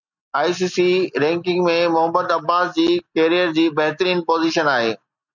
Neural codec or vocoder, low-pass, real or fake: none; 7.2 kHz; real